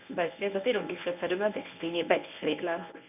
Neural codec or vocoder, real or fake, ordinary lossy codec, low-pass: codec, 24 kHz, 0.9 kbps, WavTokenizer, medium speech release version 1; fake; none; 3.6 kHz